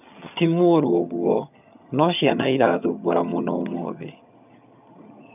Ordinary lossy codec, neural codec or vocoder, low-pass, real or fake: none; vocoder, 22.05 kHz, 80 mel bands, HiFi-GAN; 3.6 kHz; fake